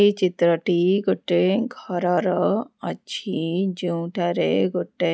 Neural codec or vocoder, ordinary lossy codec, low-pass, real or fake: none; none; none; real